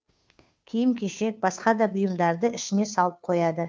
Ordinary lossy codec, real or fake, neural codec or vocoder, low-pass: none; fake; codec, 16 kHz, 2 kbps, FunCodec, trained on Chinese and English, 25 frames a second; none